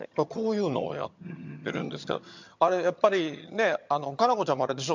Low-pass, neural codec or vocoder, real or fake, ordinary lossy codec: 7.2 kHz; vocoder, 22.05 kHz, 80 mel bands, HiFi-GAN; fake; MP3, 64 kbps